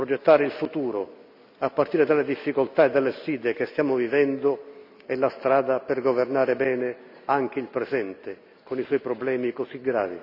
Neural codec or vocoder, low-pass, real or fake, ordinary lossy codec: none; 5.4 kHz; real; none